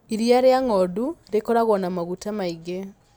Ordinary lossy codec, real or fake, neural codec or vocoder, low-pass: none; fake; vocoder, 44.1 kHz, 128 mel bands every 256 samples, BigVGAN v2; none